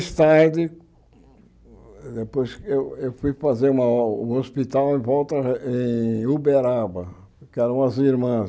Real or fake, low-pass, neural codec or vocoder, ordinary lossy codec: real; none; none; none